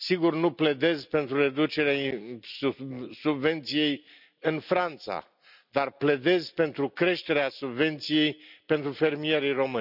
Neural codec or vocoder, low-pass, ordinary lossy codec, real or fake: none; 5.4 kHz; none; real